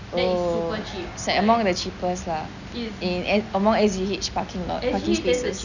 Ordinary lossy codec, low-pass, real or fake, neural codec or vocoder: none; 7.2 kHz; real; none